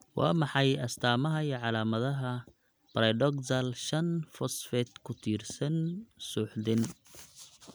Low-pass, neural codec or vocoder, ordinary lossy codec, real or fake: none; none; none; real